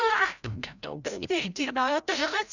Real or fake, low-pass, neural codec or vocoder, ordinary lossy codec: fake; 7.2 kHz; codec, 16 kHz, 0.5 kbps, FreqCodec, larger model; none